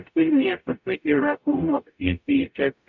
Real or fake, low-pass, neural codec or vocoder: fake; 7.2 kHz; codec, 44.1 kHz, 0.9 kbps, DAC